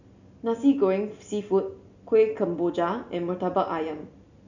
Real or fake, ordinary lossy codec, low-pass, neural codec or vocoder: real; none; 7.2 kHz; none